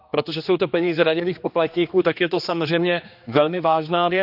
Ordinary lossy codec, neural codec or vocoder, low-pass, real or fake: none; codec, 16 kHz, 2 kbps, X-Codec, HuBERT features, trained on general audio; 5.4 kHz; fake